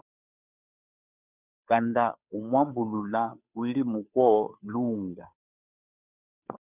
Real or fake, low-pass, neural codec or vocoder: fake; 3.6 kHz; codec, 16 kHz, 2 kbps, FunCodec, trained on Chinese and English, 25 frames a second